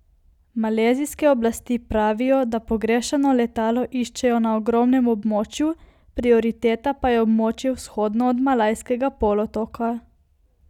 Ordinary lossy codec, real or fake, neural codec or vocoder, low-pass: none; real; none; 19.8 kHz